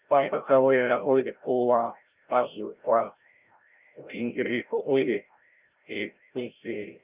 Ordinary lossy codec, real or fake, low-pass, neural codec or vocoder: Opus, 24 kbps; fake; 3.6 kHz; codec, 16 kHz, 0.5 kbps, FreqCodec, larger model